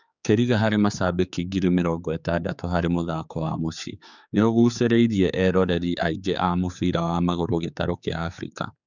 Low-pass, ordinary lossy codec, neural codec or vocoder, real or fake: 7.2 kHz; none; codec, 16 kHz, 4 kbps, X-Codec, HuBERT features, trained on general audio; fake